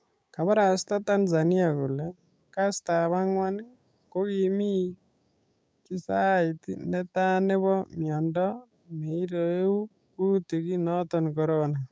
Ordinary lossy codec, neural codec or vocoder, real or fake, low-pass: none; codec, 16 kHz, 6 kbps, DAC; fake; none